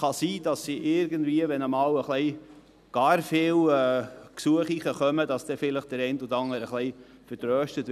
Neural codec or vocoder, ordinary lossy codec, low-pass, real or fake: none; none; 14.4 kHz; real